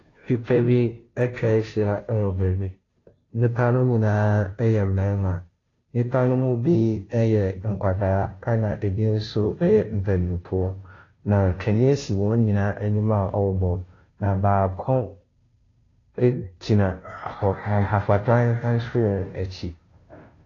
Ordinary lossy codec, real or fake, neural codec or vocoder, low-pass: AAC, 32 kbps; fake; codec, 16 kHz, 0.5 kbps, FunCodec, trained on Chinese and English, 25 frames a second; 7.2 kHz